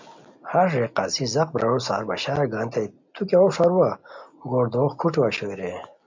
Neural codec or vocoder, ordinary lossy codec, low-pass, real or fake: none; MP3, 48 kbps; 7.2 kHz; real